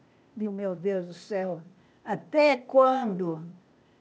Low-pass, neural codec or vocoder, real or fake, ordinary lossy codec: none; codec, 16 kHz, 0.8 kbps, ZipCodec; fake; none